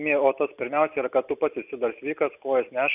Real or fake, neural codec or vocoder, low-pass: real; none; 3.6 kHz